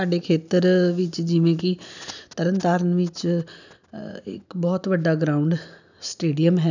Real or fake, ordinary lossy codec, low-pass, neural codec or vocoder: real; none; 7.2 kHz; none